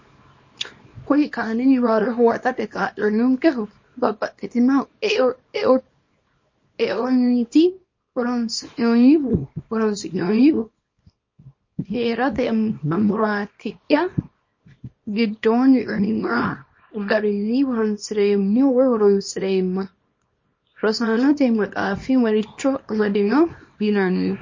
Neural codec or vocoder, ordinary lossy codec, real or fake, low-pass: codec, 24 kHz, 0.9 kbps, WavTokenizer, small release; MP3, 32 kbps; fake; 7.2 kHz